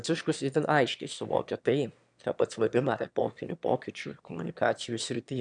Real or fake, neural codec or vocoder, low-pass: fake; autoencoder, 22.05 kHz, a latent of 192 numbers a frame, VITS, trained on one speaker; 9.9 kHz